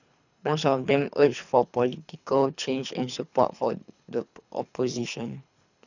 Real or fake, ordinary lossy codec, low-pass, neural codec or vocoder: fake; none; 7.2 kHz; codec, 24 kHz, 3 kbps, HILCodec